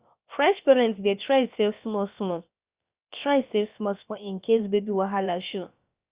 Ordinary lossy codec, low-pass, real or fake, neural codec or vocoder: Opus, 64 kbps; 3.6 kHz; fake; codec, 16 kHz, about 1 kbps, DyCAST, with the encoder's durations